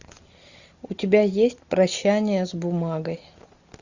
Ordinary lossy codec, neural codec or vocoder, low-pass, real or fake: Opus, 64 kbps; none; 7.2 kHz; real